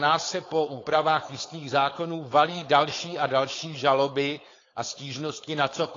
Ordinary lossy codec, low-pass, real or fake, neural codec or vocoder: AAC, 32 kbps; 7.2 kHz; fake; codec, 16 kHz, 4.8 kbps, FACodec